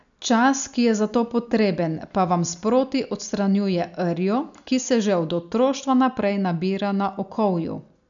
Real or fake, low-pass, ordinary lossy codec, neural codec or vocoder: real; 7.2 kHz; MP3, 96 kbps; none